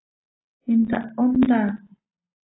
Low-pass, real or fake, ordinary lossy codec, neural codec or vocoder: 7.2 kHz; real; AAC, 16 kbps; none